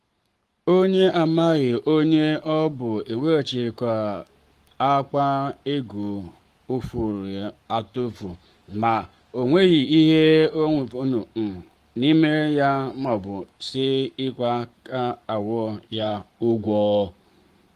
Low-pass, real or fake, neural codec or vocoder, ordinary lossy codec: 14.4 kHz; fake; codec, 44.1 kHz, 7.8 kbps, Pupu-Codec; Opus, 24 kbps